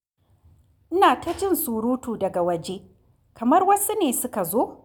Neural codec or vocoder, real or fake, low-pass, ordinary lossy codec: none; real; none; none